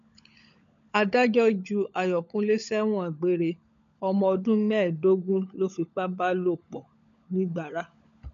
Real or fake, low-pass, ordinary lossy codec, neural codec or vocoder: fake; 7.2 kHz; MP3, 64 kbps; codec, 16 kHz, 16 kbps, FunCodec, trained on LibriTTS, 50 frames a second